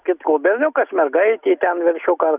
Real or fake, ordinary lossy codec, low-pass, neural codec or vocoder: real; Opus, 64 kbps; 3.6 kHz; none